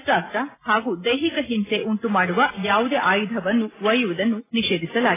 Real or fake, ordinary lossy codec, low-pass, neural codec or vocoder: real; AAC, 16 kbps; 3.6 kHz; none